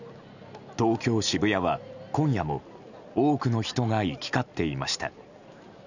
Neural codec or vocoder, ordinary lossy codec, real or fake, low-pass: none; none; real; 7.2 kHz